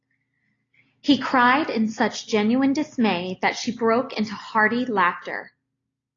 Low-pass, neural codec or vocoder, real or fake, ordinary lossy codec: 7.2 kHz; none; real; AAC, 32 kbps